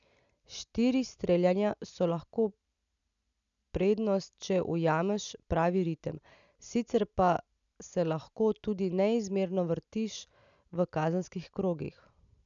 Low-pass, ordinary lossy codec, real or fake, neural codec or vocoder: 7.2 kHz; none; real; none